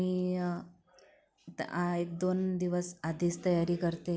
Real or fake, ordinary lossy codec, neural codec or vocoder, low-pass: real; none; none; none